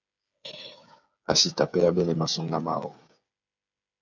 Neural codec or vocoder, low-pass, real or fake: codec, 16 kHz, 8 kbps, FreqCodec, smaller model; 7.2 kHz; fake